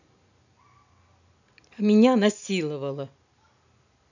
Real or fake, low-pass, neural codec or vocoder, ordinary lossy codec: real; 7.2 kHz; none; none